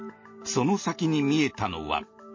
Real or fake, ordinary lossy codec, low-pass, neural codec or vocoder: real; MP3, 32 kbps; 7.2 kHz; none